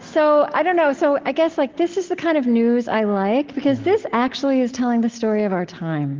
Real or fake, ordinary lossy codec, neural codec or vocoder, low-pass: real; Opus, 16 kbps; none; 7.2 kHz